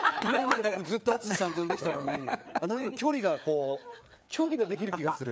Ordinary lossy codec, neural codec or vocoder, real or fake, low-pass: none; codec, 16 kHz, 4 kbps, FreqCodec, larger model; fake; none